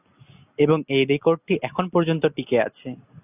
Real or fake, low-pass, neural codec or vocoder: real; 3.6 kHz; none